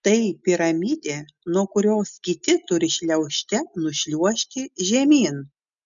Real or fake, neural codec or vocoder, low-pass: real; none; 7.2 kHz